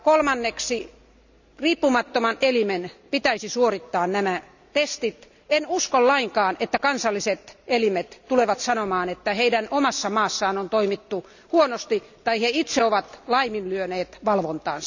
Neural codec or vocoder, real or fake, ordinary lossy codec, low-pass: none; real; none; 7.2 kHz